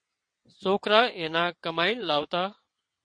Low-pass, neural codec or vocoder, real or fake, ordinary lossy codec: 9.9 kHz; vocoder, 22.05 kHz, 80 mel bands, WaveNeXt; fake; MP3, 48 kbps